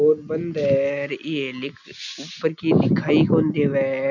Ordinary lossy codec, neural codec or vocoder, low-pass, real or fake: none; none; 7.2 kHz; real